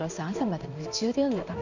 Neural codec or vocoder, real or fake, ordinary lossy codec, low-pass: codec, 16 kHz in and 24 kHz out, 1 kbps, XY-Tokenizer; fake; none; 7.2 kHz